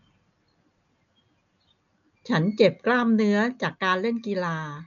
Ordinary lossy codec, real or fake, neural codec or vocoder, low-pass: none; real; none; 7.2 kHz